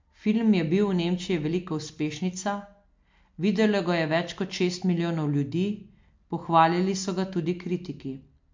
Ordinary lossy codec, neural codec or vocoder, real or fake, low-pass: MP3, 48 kbps; none; real; 7.2 kHz